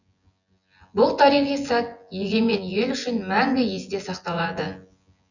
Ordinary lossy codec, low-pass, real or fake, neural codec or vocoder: none; 7.2 kHz; fake; vocoder, 24 kHz, 100 mel bands, Vocos